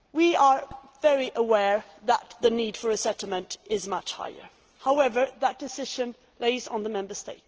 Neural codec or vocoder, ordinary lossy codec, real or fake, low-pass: none; Opus, 16 kbps; real; 7.2 kHz